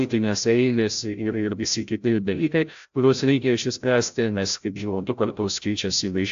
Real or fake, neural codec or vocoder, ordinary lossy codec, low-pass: fake; codec, 16 kHz, 0.5 kbps, FreqCodec, larger model; AAC, 64 kbps; 7.2 kHz